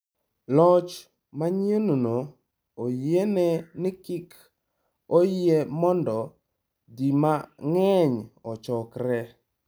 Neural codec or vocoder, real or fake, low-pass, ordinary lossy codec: none; real; none; none